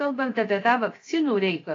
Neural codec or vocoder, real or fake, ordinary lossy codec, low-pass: codec, 16 kHz, 0.7 kbps, FocalCodec; fake; AAC, 32 kbps; 7.2 kHz